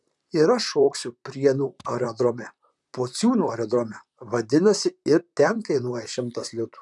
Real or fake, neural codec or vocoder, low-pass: fake; vocoder, 44.1 kHz, 128 mel bands, Pupu-Vocoder; 10.8 kHz